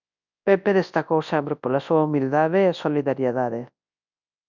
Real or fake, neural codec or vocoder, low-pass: fake; codec, 24 kHz, 0.9 kbps, WavTokenizer, large speech release; 7.2 kHz